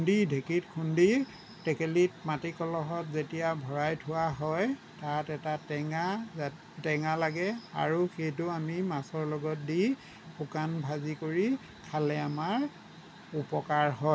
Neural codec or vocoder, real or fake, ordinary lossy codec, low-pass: none; real; none; none